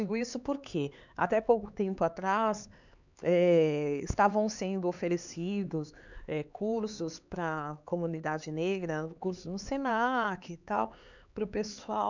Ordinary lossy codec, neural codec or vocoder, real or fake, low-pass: none; codec, 16 kHz, 4 kbps, X-Codec, HuBERT features, trained on LibriSpeech; fake; 7.2 kHz